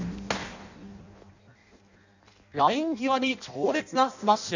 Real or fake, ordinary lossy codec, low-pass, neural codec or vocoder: fake; none; 7.2 kHz; codec, 16 kHz in and 24 kHz out, 0.6 kbps, FireRedTTS-2 codec